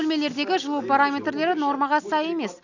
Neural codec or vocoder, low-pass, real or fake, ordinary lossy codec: none; 7.2 kHz; real; none